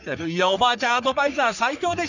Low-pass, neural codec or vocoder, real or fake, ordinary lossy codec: 7.2 kHz; codec, 44.1 kHz, 3.4 kbps, Pupu-Codec; fake; none